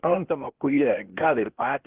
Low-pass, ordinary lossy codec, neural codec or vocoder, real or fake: 3.6 kHz; Opus, 16 kbps; codec, 16 kHz, 0.8 kbps, ZipCodec; fake